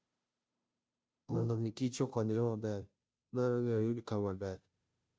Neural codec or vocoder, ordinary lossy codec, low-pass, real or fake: codec, 16 kHz, 0.5 kbps, FunCodec, trained on Chinese and English, 25 frames a second; none; none; fake